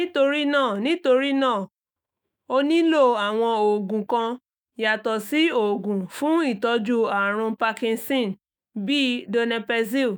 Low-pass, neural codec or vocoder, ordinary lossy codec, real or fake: none; autoencoder, 48 kHz, 128 numbers a frame, DAC-VAE, trained on Japanese speech; none; fake